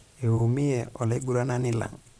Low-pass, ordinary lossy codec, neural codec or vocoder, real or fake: none; none; vocoder, 22.05 kHz, 80 mel bands, Vocos; fake